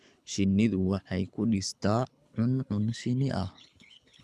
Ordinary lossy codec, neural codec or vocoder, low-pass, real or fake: none; codec, 24 kHz, 6 kbps, HILCodec; none; fake